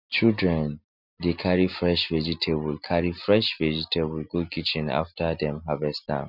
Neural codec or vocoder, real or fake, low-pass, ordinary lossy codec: none; real; 5.4 kHz; none